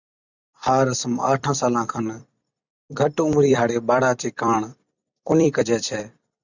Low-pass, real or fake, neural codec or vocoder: 7.2 kHz; fake; vocoder, 44.1 kHz, 128 mel bands every 256 samples, BigVGAN v2